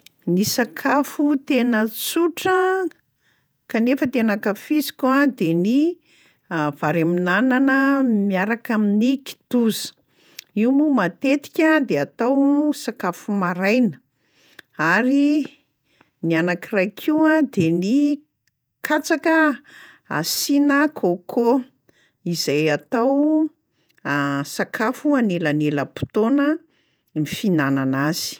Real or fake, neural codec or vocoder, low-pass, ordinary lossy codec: fake; vocoder, 48 kHz, 128 mel bands, Vocos; none; none